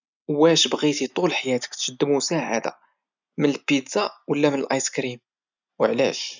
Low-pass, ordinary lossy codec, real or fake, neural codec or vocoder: 7.2 kHz; none; real; none